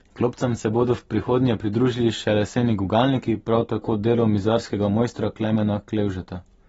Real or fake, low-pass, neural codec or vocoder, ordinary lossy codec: real; 9.9 kHz; none; AAC, 24 kbps